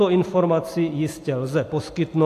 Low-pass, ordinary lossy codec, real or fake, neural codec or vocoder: 14.4 kHz; AAC, 64 kbps; real; none